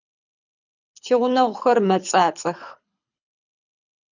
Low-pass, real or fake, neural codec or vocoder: 7.2 kHz; fake; codec, 24 kHz, 6 kbps, HILCodec